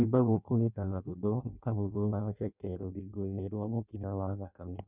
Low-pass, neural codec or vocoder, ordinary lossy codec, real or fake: 3.6 kHz; codec, 16 kHz in and 24 kHz out, 0.6 kbps, FireRedTTS-2 codec; none; fake